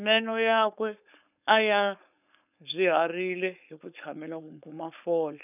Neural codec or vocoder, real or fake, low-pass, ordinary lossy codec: codec, 16 kHz, 4.8 kbps, FACodec; fake; 3.6 kHz; none